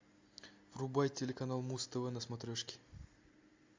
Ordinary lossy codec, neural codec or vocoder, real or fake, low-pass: MP3, 64 kbps; none; real; 7.2 kHz